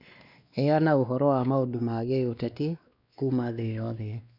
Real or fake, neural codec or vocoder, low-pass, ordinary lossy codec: fake; codec, 16 kHz, 2 kbps, X-Codec, WavLM features, trained on Multilingual LibriSpeech; 5.4 kHz; none